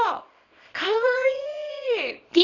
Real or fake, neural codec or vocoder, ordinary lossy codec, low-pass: fake; codec, 24 kHz, 0.9 kbps, WavTokenizer, small release; none; 7.2 kHz